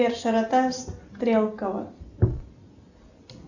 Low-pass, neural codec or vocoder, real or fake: 7.2 kHz; none; real